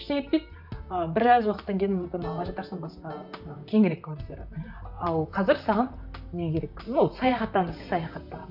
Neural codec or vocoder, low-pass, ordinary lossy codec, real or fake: vocoder, 44.1 kHz, 128 mel bands, Pupu-Vocoder; 5.4 kHz; none; fake